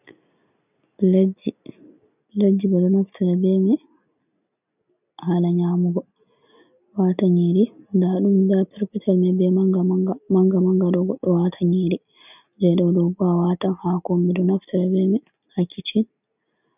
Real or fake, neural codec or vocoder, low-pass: real; none; 3.6 kHz